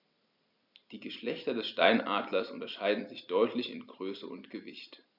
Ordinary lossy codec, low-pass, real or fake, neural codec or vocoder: none; 5.4 kHz; real; none